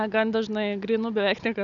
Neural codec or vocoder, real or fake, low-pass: none; real; 7.2 kHz